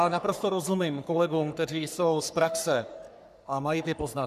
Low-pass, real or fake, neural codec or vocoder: 14.4 kHz; fake; codec, 44.1 kHz, 3.4 kbps, Pupu-Codec